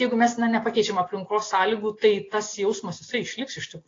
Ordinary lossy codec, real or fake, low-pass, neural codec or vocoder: AAC, 32 kbps; real; 7.2 kHz; none